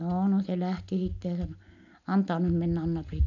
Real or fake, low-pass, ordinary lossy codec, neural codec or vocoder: real; 7.2 kHz; none; none